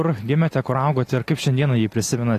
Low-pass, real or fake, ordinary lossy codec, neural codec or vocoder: 14.4 kHz; real; AAC, 48 kbps; none